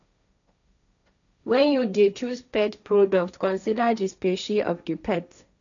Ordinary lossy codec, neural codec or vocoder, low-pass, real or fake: none; codec, 16 kHz, 1.1 kbps, Voila-Tokenizer; 7.2 kHz; fake